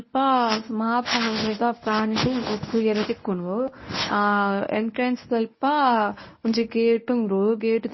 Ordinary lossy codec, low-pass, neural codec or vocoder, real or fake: MP3, 24 kbps; 7.2 kHz; codec, 24 kHz, 0.9 kbps, WavTokenizer, medium speech release version 1; fake